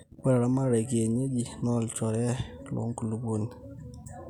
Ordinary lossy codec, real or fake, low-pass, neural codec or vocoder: none; real; 19.8 kHz; none